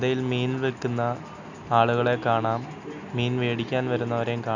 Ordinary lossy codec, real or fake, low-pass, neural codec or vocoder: none; real; 7.2 kHz; none